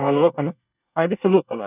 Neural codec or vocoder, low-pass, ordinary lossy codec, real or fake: codec, 24 kHz, 1 kbps, SNAC; 3.6 kHz; none; fake